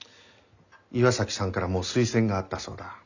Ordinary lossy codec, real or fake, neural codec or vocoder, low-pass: none; real; none; 7.2 kHz